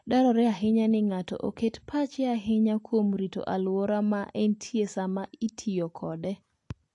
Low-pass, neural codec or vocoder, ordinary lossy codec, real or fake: 10.8 kHz; none; AAC, 48 kbps; real